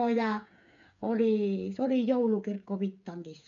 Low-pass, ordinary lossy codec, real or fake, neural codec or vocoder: 7.2 kHz; none; fake; codec, 16 kHz, 8 kbps, FreqCodec, smaller model